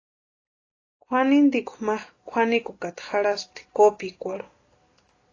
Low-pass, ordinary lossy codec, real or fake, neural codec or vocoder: 7.2 kHz; AAC, 32 kbps; real; none